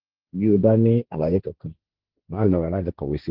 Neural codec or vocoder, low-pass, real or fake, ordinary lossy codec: codec, 16 kHz, 1.1 kbps, Voila-Tokenizer; 5.4 kHz; fake; Opus, 16 kbps